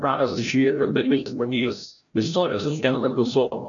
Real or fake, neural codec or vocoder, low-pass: fake; codec, 16 kHz, 0.5 kbps, FreqCodec, larger model; 7.2 kHz